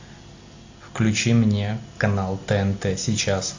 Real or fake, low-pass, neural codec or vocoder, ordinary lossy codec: real; 7.2 kHz; none; AAC, 48 kbps